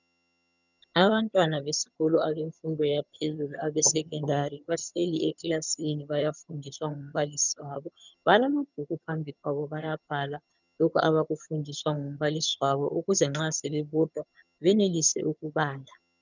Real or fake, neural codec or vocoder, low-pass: fake; vocoder, 22.05 kHz, 80 mel bands, HiFi-GAN; 7.2 kHz